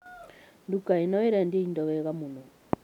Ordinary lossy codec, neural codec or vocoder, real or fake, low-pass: none; none; real; 19.8 kHz